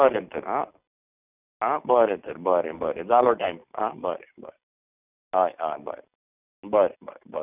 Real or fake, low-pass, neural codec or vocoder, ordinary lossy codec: real; 3.6 kHz; none; none